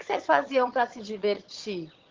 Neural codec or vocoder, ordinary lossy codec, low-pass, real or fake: vocoder, 22.05 kHz, 80 mel bands, HiFi-GAN; Opus, 16 kbps; 7.2 kHz; fake